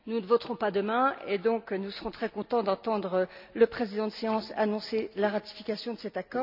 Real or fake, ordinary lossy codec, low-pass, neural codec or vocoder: real; none; 5.4 kHz; none